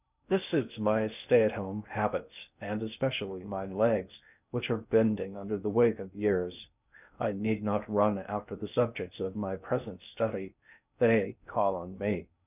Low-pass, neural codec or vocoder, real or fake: 3.6 kHz; codec, 16 kHz in and 24 kHz out, 0.6 kbps, FocalCodec, streaming, 2048 codes; fake